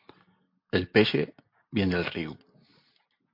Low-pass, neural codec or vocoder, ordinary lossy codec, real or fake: 5.4 kHz; none; MP3, 32 kbps; real